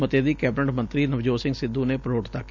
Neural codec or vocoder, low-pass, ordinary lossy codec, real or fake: none; 7.2 kHz; none; real